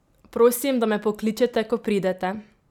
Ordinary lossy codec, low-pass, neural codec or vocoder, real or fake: none; 19.8 kHz; none; real